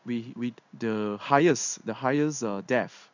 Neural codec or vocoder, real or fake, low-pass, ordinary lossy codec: codec, 16 kHz in and 24 kHz out, 1 kbps, XY-Tokenizer; fake; 7.2 kHz; none